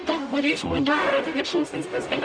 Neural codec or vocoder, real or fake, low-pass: codec, 44.1 kHz, 0.9 kbps, DAC; fake; 9.9 kHz